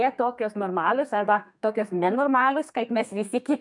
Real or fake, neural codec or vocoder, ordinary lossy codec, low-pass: fake; codec, 32 kHz, 1.9 kbps, SNAC; MP3, 96 kbps; 10.8 kHz